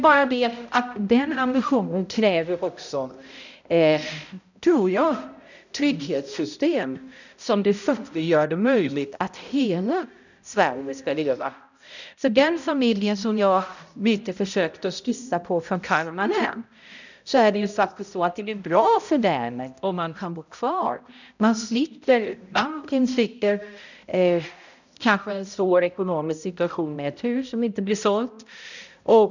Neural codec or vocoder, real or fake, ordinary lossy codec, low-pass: codec, 16 kHz, 0.5 kbps, X-Codec, HuBERT features, trained on balanced general audio; fake; none; 7.2 kHz